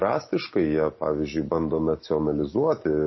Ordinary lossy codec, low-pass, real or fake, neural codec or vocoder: MP3, 24 kbps; 7.2 kHz; real; none